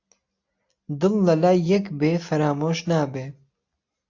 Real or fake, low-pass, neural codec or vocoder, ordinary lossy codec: real; 7.2 kHz; none; AAC, 48 kbps